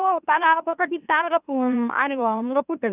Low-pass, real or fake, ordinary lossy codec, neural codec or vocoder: 3.6 kHz; fake; none; autoencoder, 44.1 kHz, a latent of 192 numbers a frame, MeloTTS